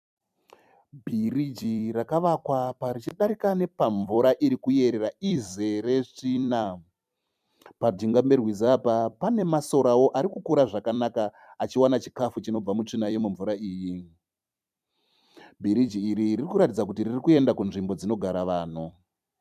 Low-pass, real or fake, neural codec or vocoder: 14.4 kHz; fake; vocoder, 44.1 kHz, 128 mel bands every 256 samples, BigVGAN v2